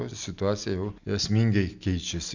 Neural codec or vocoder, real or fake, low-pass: none; real; 7.2 kHz